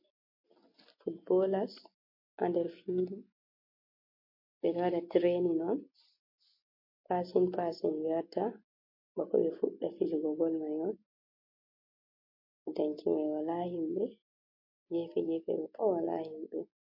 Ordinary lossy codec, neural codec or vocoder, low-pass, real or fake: MP3, 32 kbps; none; 5.4 kHz; real